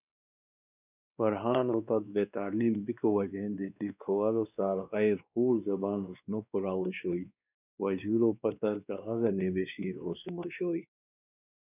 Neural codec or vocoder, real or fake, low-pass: codec, 16 kHz, 2 kbps, X-Codec, WavLM features, trained on Multilingual LibriSpeech; fake; 3.6 kHz